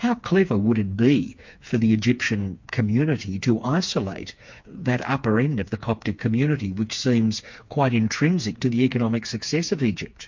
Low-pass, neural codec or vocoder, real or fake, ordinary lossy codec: 7.2 kHz; codec, 16 kHz, 4 kbps, FreqCodec, smaller model; fake; MP3, 48 kbps